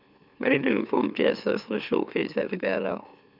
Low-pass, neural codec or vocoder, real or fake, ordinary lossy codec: 5.4 kHz; autoencoder, 44.1 kHz, a latent of 192 numbers a frame, MeloTTS; fake; none